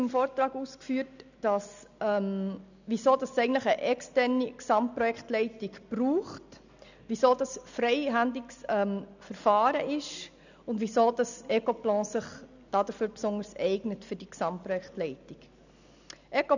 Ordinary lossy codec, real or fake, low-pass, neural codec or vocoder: none; real; 7.2 kHz; none